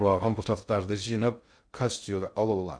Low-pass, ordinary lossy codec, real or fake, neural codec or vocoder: 9.9 kHz; Opus, 64 kbps; fake; codec, 16 kHz in and 24 kHz out, 0.6 kbps, FocalCodec, streaming, 2048 codes